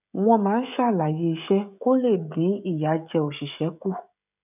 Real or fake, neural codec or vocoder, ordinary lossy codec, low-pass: fake; codec, 16 kHz, 16 kbps, FreqCodec, smaller model; none; 3.6 kHz